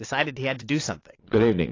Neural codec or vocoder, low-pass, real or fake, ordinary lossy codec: vocoder, 22.05 kHz, 80 mel bands, Vocos; 7.2 kHz; fake; AAC, 32 kbps